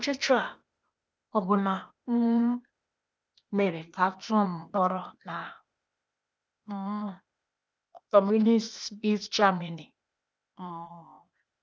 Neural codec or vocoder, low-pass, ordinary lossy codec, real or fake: codec, 16 kHz, 0.8 kbps, ZipCodec; none; none; fake